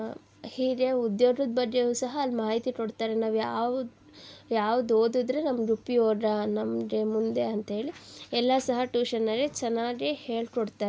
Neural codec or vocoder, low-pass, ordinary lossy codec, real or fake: none; none; none; real